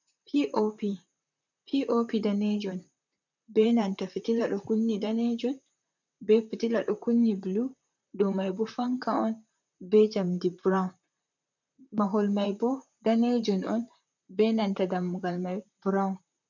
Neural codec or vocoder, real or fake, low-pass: vocoder, 44.1 kHz, 128 mel bands, Pupu-Vocoder; fake; 7.2 kHz